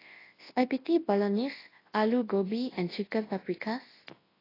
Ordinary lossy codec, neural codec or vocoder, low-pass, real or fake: AAC, 24 kbps; codec, 24 kHz, 0.9 kbps, WavTokenizer, large speech release; 5.4 kHz; fake